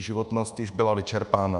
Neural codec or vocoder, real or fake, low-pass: codec, 24 kHz, 1.2 kbps, DualCodec; fake; 10.8 kHz